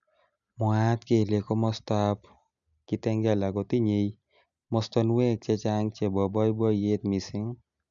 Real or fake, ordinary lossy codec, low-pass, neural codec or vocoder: real; none; 7.2 kHz; none